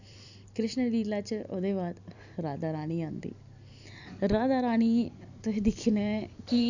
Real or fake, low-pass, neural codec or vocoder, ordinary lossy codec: fake; 7.2 kHz; vocoder, 44.1 kHz, 128 mel bands every 256 samples, BigVGAN v2; none